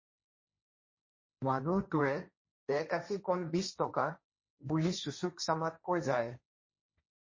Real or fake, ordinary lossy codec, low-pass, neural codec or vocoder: fake; MP3, 32 kbps; 7.2 kHz; codec, 16 kHz, 1.1 kbps, Voila-Tokenizer